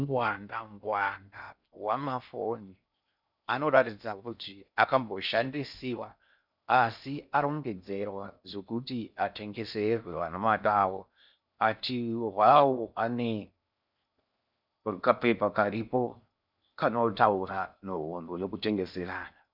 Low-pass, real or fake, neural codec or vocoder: 5.4 kHz; fake; codec, 16 kHz in and 24 kHz out, 0.6 kbps, FocalCodec, streaming, 2048 codes